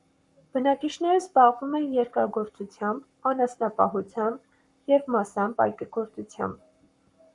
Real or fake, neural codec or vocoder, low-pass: fake; codec, 44.1 kHz, 7.8 kbps, Pupu-Codec; 10.8 kHz